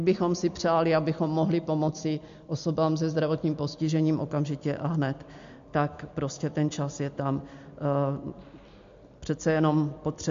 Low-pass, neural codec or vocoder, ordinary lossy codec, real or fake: 7.2 kHz; none; MP3, 48 kbps; real